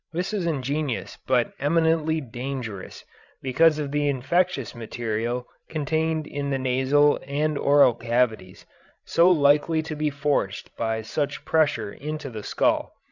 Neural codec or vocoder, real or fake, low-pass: codec, 16 kHz, 16 kbps, FreqCodec, larger model; fake; 7.2 kHz